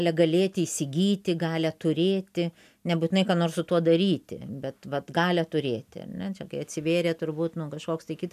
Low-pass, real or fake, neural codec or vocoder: 14.4 kHz; real; none